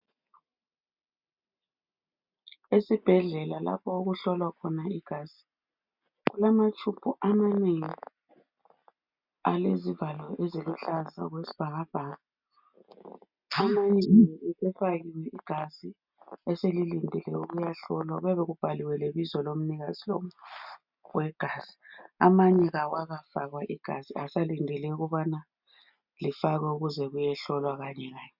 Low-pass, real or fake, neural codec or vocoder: 5.4 kHz; real; none